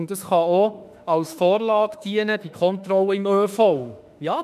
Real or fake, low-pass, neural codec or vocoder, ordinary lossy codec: fake; 14.4 kHz; autoencoder, 48 kHz, 32 numbers a frame, DAC-VAE, trained on Japanese speech; none